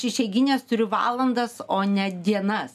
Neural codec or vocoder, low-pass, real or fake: none; 14.4 kHz; real